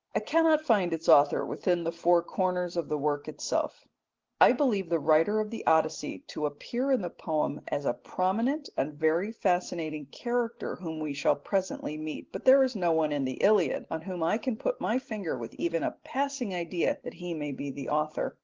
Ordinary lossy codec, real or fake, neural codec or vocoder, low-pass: Opus, 16 kbps; real; none; 7.2 kHz